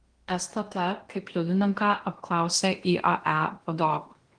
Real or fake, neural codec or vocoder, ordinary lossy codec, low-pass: fake; codec, 16 kHz in and 24 kHz out, 0.8 kbps, FocalCodec, streaming, 65536 codes; Opus, 24 kbps; 9.9 kHz